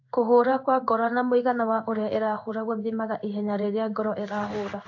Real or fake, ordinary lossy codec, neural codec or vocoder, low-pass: fake; AAC, 48 kbps; codec, 16 kHz in and 24 kHz out, 1 kbps, XY-Tokenizer; 7.2 kHz